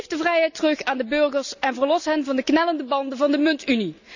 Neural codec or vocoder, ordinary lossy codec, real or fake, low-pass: none; none; real; 7.2 kHz